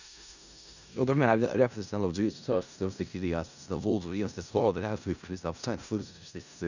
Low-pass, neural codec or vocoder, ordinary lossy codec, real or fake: 7.2 kHz; codec, 16 kHz in and 24 kHz out, 0.4 kbps, LongCat-Audio-Codec, four codebook decoder; none; fake